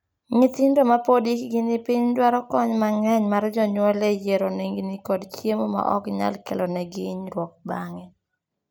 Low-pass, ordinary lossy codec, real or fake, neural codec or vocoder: none; none; real; none